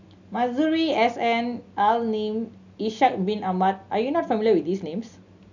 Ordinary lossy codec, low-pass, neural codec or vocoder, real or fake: none; 7.2 kHz; none; real